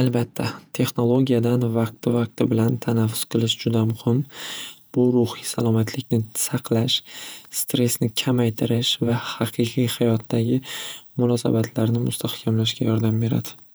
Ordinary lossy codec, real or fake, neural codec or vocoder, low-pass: none; fake; vocoder, 48 kHz, 128 mel bands, Vocos; none